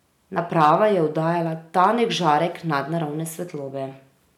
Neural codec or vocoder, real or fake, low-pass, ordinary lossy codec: none; real; 19.8 kHz; none